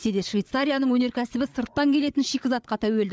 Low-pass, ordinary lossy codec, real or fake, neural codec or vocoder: none; none; fake; codec, 16 kHz, 8 kbps, FreqCodec, larger model